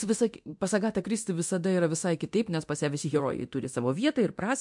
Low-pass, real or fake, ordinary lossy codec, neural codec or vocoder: 10.8 kHz; fake; MP3, 64 kbps; codec, 24 kHz, 0.9 kbps, DualCodec